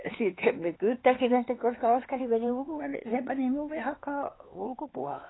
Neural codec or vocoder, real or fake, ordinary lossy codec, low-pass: codec, 16 kHz, 4 kbps, X-Codec, HuBERT features, trained on LibriSpeech; fake; AAC, 16 kbps; 7.2 kHz